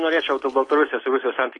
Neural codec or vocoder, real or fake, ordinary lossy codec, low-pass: none; real; AAC, 32 kbps; 10.8 kHz